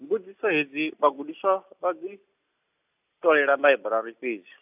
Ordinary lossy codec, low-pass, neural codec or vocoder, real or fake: AAC, 32 kbps; 3.6 kHz; none; real